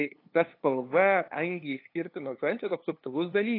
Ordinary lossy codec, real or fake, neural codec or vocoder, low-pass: AAC, 32 kbps; fake; codec, 16 kHz, 2 kbps, FunCodec, trained on LibriTTS, 25 frames a second; 5.4 kHz